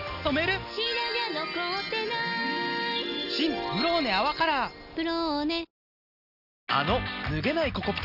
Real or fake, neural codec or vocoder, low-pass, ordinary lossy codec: real; none; 5.4 kHz; none